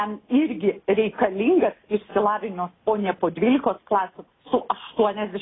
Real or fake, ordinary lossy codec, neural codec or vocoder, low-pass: real; AAC, 16 kbps; none; 7.2 kHz